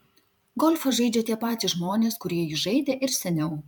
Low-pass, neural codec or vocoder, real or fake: 19.8 kHz; vocoder, 44.1 kHz, 128 mel bands every 512 samples, BigVGAN v2; fake